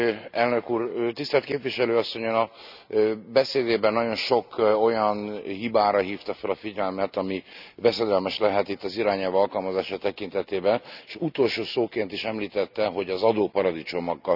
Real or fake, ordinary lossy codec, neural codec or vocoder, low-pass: real; none; none; 5.4 kHz